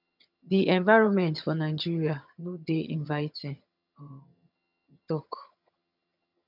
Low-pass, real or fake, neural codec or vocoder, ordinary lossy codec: 5.4 kHz; fake; vocoder, 22.05 kHz, 80 mel bands, HiFi-GAN; none